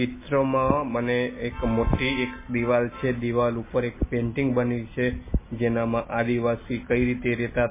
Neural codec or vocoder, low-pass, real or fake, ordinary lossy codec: none; 3.6 kHz; real; MP3, 16 kbps